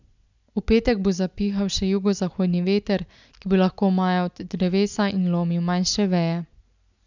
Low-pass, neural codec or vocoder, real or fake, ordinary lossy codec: 7.2 kHz; none; real; none